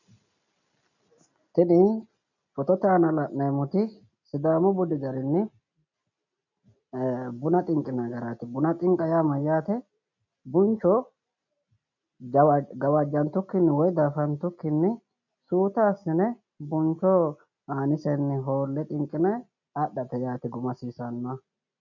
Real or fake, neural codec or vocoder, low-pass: real; none; 7.2 kHz